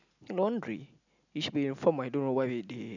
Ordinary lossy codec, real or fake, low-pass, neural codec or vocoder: none; real; 7.2 kHz; none